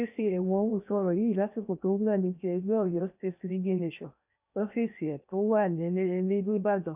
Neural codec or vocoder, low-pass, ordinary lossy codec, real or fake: codec, 16 kHz in and 24 kHz out, 0.8 kbps, FocalCodec, streaming, 65536 codes; 3.6 kHz; none; fake